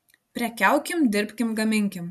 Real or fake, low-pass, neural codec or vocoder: real; 14.4 kHz; none